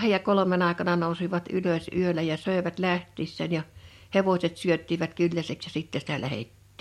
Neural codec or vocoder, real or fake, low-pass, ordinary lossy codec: none; real; 19.8 kHz; MP3, 64 kbps